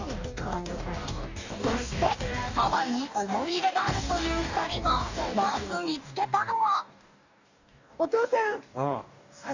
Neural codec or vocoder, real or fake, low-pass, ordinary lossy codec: codec, 44.1 kHz, 2.6 kbps, DAC; fake; 7.2 kHz; none